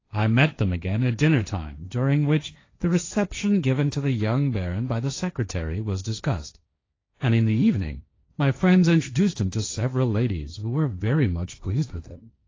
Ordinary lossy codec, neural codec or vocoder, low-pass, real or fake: AAC, 32 kbps; codec, 16 kHz, 1.1 kbps, Voila-Tokenizer; 7.2 kHz; fake